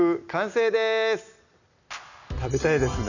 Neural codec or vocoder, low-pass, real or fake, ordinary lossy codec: none; 7.2 kHz; real; none